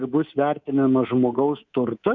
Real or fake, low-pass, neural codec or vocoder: fake; 7.2 kHz; codec, 24 kHz, 3.1 kbps, DualCodec